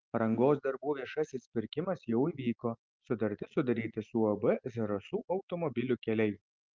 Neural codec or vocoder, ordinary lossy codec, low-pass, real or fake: none; Opus, 24 kbps; 7.2 kHz; real